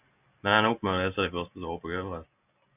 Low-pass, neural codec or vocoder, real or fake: 3.6 kHz; none; real